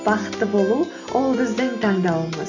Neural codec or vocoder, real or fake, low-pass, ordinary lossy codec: none; real; 7.2 kHz; AAC, 48 kbps